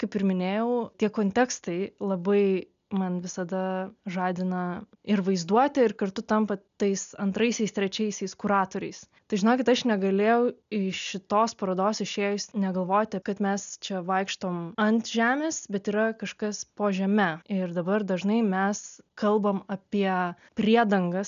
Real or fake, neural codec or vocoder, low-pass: real; none; 7.2 kHz